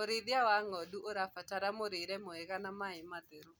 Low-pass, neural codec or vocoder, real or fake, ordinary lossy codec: none; none; real; none